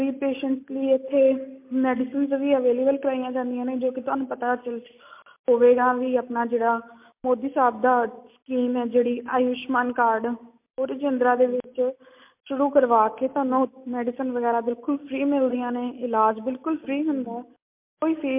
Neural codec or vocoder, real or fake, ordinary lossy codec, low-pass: none; real; MP3, 32 kbps; 3.6 kHz